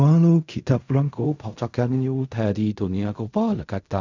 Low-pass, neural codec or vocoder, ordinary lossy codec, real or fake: 7.2 kHz; codec, 16 kHz in and 24 kHz out, 0.4 kbps, LongCat-Audio-Codec, fine tuned four codebook decoder; none; fake